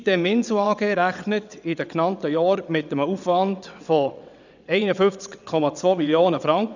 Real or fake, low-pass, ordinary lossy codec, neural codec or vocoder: fake; 7.2 kHz; none; vocoder, 22.05 kHz, 80 mel bands, WaveNeXt